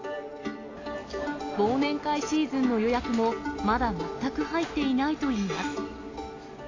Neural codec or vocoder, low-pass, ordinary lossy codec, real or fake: none; 7.2 kHz; AAC, 32 kbps; real